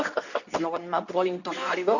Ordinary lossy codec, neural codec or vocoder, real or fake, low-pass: none; codec, 24 kHz, 0.9 kbps, WavTokenizer, medium speech release version 2; fake; 7.2 kHz